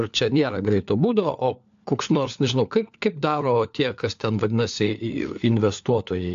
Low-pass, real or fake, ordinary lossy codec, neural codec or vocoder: 7.2 kHz; fake; AAC, 64 kbps; codec, 16 kHz, 4 kbps, FunCodec, trained on LibriTTS, 50 frames a second